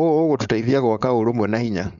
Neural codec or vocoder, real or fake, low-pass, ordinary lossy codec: codec, 16 kHz, 4.8 kbps, FACodec; fake; 7.2 kHz; none